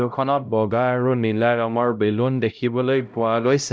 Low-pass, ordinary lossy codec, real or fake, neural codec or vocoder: none; none; fake; codec, 16 kHz, 0.5 kbps, X-Codec, HuBERT features, trained on LibriSpeech